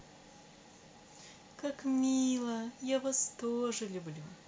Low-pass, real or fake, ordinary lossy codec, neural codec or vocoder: none; real; none; none